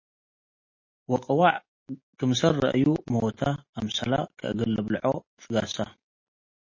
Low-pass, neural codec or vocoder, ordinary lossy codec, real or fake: 7.2 kHz; none; MP3, 32 kbps; real